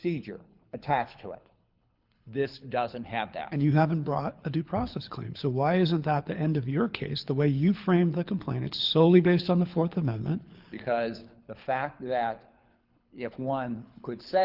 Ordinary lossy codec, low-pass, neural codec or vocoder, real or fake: Opus, 24 kbps; 5.4 kHz; codec, 24 kHz, 6 kbps, HILCodec; fake